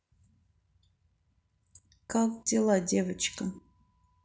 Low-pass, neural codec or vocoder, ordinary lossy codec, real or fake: none; none; none; real